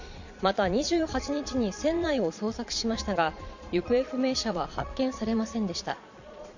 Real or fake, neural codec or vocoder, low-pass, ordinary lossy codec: fake; vocoder, 22.05 kHz, 80 mel bands, Vocos; 7.2 kHz; Opus, 64 kbps